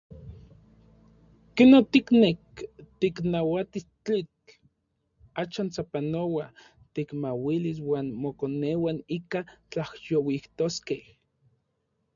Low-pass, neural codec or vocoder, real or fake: 7.2 kHz; none; real